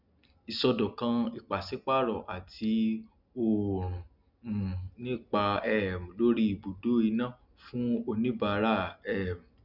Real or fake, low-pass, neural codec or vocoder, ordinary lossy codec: real; 5.4 kHz; none; none